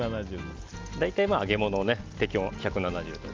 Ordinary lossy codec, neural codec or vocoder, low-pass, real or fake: Opus, 32 kbps; none; 7.2 kHz; real